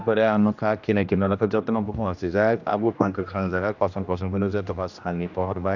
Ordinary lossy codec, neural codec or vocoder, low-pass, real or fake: none; codec, 16 kHz, 1 kbps, X-Codec, HuBERT features, trained on general audio; 7.2 kHz; fake